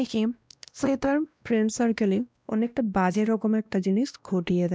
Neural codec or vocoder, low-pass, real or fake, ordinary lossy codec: codec, 16 kHz, 1 kbps, X-Codec, WavLM features, trained on Multilingual LibriSpeech; none; fake; none